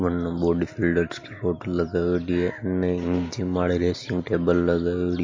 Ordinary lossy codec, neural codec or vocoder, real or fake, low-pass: MP3, 32 kbps; none; real; 7.2 kHz